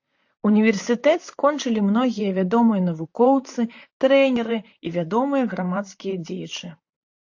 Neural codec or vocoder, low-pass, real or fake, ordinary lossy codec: vocoder, 44.1 kHz, 128 mel bands, Pupu-Vocoder; 7.2 kHz; fake; AAC, 48 kbps